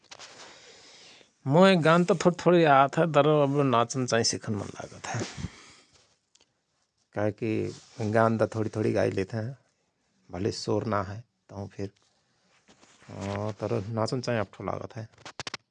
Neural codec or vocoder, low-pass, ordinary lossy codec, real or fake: none; 9.9 kHz; none; real